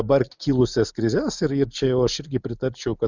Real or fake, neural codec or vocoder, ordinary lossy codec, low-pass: real; none; Opus, 64 kbps; 7.2 kHz